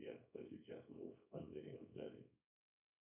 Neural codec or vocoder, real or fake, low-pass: codec, 24 kHz, 0.9 kbps, WavTokenizer, small release; fake; 3.6 kHz